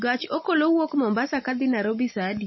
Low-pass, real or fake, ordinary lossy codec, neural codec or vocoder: 7.2 kHz; real; MP3, 24 kbps; none